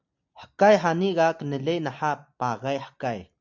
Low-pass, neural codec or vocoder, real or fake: 7.2 kHz; none; real